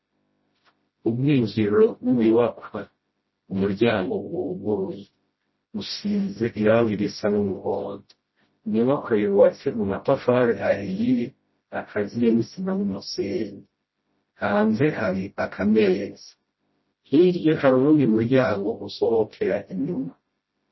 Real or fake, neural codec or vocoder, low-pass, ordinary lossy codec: fake; codec, 16 kHz, 0.5 kbps, FreqCodec, smaller model; 7.2 kHz; MP3, 24 kbps